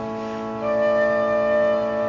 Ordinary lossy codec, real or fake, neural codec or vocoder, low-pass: Opus, 64 kbps; real; none; 7.2 kHz